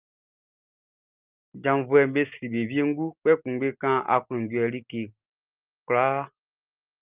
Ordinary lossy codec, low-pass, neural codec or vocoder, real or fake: Opus, 32 kbps; 3.6 kHz; none; real